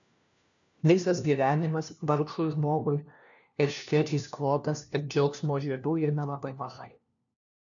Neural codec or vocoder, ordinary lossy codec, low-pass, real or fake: codec, 16 kHz, 1 kbps, FunCodec, trained on LibriTTS, 50 frames a second; MP3, 96 kbps; 7.2 kHz; fake